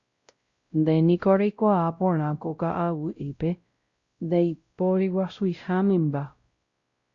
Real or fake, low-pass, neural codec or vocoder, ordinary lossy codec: fake; 7.2 kHz; codec, 16 kHz, 0.5 kbps, X-Codec, WavLM features, trained on Multilingual LibriSpeech; Opus, 64 kbps